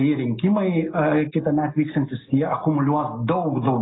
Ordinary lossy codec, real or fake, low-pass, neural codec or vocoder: AAC, 16 kbps; real; 7.2 kHz; none